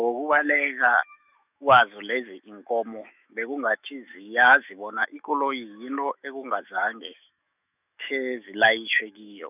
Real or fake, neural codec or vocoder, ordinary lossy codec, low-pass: fake; autoencoder, 48 kHz, 128 numbers a frame, DAC-VAE, trained on Japanese speech; none; 3.6 kHz